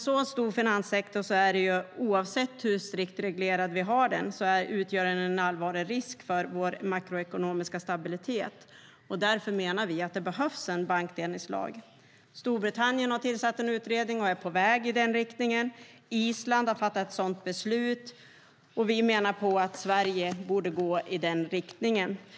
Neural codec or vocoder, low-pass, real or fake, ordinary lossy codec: none; none; real; none